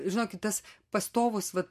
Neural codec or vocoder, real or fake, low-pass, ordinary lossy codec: none; real; 14.4 kHz; MP3, 64 kbps